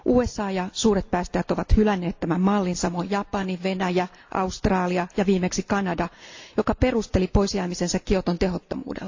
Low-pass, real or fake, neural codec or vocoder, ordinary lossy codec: 7.2 kHz; real; none; AAC, 48 kbps